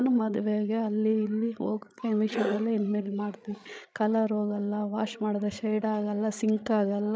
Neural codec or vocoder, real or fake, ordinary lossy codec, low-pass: codec, 16 kHz, 16 kbps, FreqCodec, larger model; fake; none; none